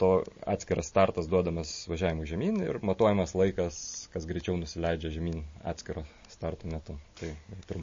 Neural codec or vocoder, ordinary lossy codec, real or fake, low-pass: none; MP3, 32 kbps; real; 7.2 kHz